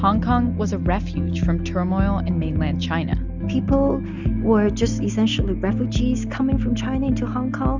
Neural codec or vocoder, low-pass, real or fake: none; 7.2 kHz; real